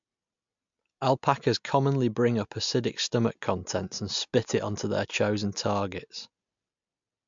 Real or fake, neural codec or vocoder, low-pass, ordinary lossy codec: real; none; 7.2 kHz; MP3, 64 kbps